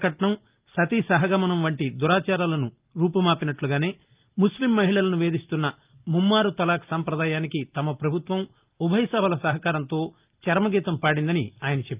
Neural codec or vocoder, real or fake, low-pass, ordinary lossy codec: none; real; 3.6 kHz; Opus, 32 kbps